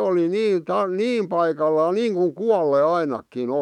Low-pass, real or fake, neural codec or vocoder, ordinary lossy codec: 19.8 kHz; fake; autoencoder, 48 kHz, 128 numbers a frame, DAC-VAE, trained on Japanese speech; none